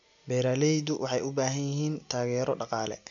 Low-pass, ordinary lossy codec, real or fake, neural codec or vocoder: 7.2 kHz; none; real; none